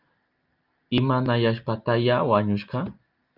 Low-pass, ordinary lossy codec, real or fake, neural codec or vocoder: 5.4 kHz; Opus, 24 kbps; real; none